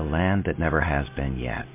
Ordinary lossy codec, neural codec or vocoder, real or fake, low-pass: MP3, 24 kbps; none; real; 3.6 kHz